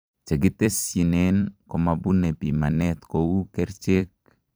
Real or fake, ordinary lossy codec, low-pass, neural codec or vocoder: fake; none; none; vocoder, 44.1 kHz, 128 mel bands every 256 samples, BigVGAN v2